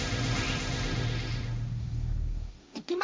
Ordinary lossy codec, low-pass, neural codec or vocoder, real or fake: none; none; codec, 16 kHz, 1.1 kbps, Voila-Tokenizer; fake